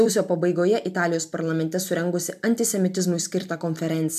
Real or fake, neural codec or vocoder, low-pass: fake; vocoder, 44.1 kHz, 128 mel bands every 256 samples, BigVGAN v2; 14.4 kHz